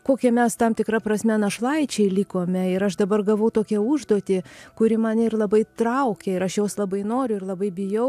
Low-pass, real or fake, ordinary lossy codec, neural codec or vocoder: 14.4 kHz; real; AAC, 96 kbps; none